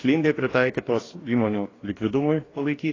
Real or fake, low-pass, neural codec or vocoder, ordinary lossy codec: fake; 7.2 kHz; codec, 44.1 kHz, 2.6 kbps, DAC; AAC, 32 kbps